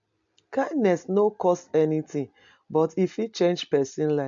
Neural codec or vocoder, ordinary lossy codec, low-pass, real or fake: none; MP3, 64 kbps; 7.2 kHz; real